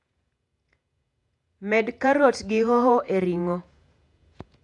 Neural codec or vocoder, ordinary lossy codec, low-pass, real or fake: vocoder, 48 kHz, 128 mel bands, Vocos; AAC, 64 kbps; 10.8 kHz; fake